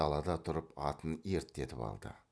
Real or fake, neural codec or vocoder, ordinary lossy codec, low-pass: real; none; none; none